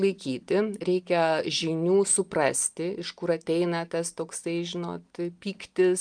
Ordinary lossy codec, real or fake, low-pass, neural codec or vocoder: Opus, 32 kbps; real; 9.9 kHz; none